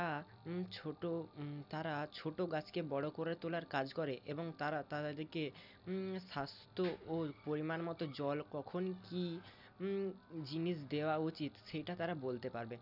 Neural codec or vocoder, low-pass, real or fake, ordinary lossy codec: none; 5.4 kHz; real; none